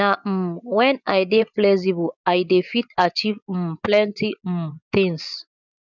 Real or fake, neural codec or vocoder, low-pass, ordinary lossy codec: fake; vocoder, 24 kHz, 100 mel bands, Vocos; 7.2 kHz; none